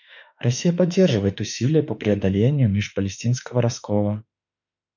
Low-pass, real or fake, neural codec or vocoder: 7.2 kHz; fake; autoencoder, 48 kHz, 32 numbers a frame, DAC-VAE, trained on Japanese speech